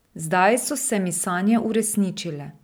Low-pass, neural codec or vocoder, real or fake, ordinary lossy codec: none; none; real; none